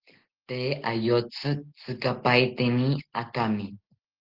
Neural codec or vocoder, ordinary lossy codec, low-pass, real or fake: none; Opus, 16 kbps; 5.4 kHz; real